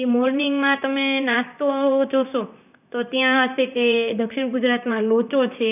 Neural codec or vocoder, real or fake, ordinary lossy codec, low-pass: vocoder, 44.1 kHz, 128 mel bands, Pupu-Vocoder; fake; none; 3.6 kHz